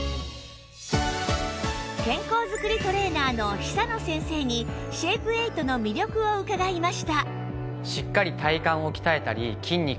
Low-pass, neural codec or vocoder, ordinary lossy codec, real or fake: none; none; none; real